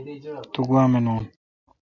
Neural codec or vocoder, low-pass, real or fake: none; 7.2 kHz; real